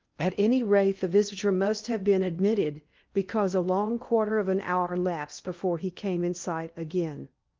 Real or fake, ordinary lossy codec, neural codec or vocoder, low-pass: fake; Opus, 32 kbps; codec, 16 kHz in and 24 kHz out, 0.8 kbps, FocalCodec, streaming, 65536 codes; 7.2 kHz